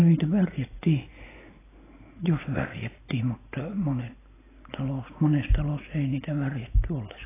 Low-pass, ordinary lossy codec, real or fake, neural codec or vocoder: 3.6 kHz; AAC, 16 kbps; real; none